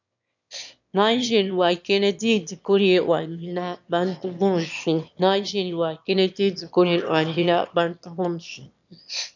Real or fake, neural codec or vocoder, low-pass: fake; autoencoder, 22.05 kHz, a latent of 192 numbers a frame, VITS, trained on one speaker; 7.2 kHz